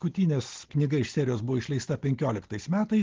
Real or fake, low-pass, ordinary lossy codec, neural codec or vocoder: real; 7.2 kHz; Opus, 16 kbps; none